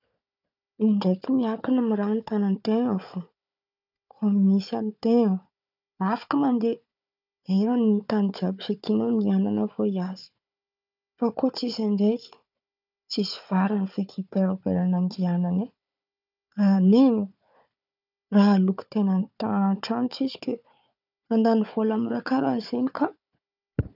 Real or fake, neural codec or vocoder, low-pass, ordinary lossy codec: fake; codec, 16 kHz, 4 kbps, FunCodec, trained on Chinese and English, 50 frames a second; 5.4 kHz; none